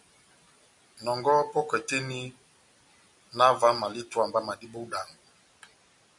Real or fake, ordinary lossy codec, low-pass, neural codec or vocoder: real; MP3, 96 kbps; 10.8 kHz; none